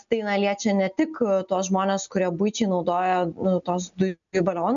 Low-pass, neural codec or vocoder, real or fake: 7.2 kHz; none; real